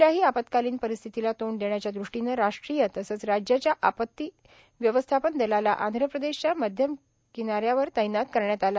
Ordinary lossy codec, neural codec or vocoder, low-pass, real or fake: none; none; none; real